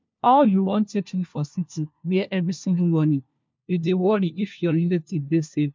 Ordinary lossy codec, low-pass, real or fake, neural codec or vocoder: MP3, 64 kbps; 7.2 kHz; fake; codec, 16 kHz, 1 kbps, FunCodec, trained on LibriTTS, 50 frames a second